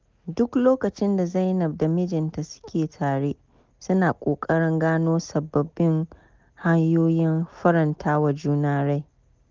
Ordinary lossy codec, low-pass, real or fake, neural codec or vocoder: Opus, 32 kbps; 7.2 kHz; real; none